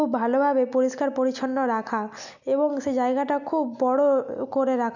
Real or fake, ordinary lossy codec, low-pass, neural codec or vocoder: real; none; 7.2 kHz; none